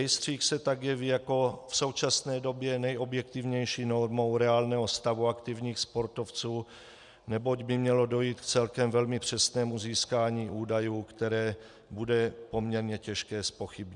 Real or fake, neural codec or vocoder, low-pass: real; none; 10.8 kHz